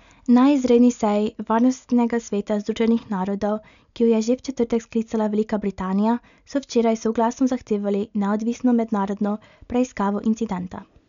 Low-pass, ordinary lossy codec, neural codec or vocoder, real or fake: 7.2 kHz; none; none; real